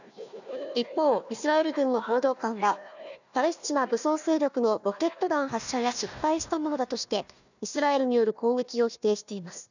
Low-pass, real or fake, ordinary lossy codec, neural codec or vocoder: 7.2 kHz; fake; none; codec, 16 kHz, 1 kbps, FunCodec, trained on Chinese and English, 50 frames a second